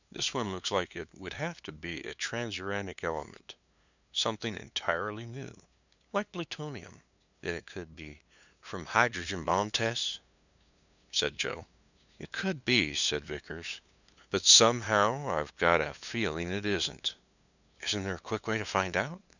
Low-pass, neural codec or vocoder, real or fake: 7.2 kHz; codec, 16 kHz, 2 kbps, FunCodec, trained on LibriTTS, 25 frames a second; fake